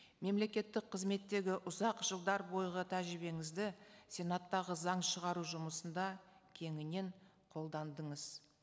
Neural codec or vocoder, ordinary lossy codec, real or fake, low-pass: none; none; real; none